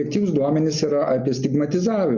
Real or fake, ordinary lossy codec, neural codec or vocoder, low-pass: real; Opus, 64 kbps; none; 7.2 kHz